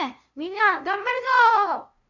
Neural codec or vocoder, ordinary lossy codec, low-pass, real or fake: codec, 16 kHz, 0.5 kbps, FunCodec, trained on LibriTTS, 25 frames a second; none; 7.2 kHz; fake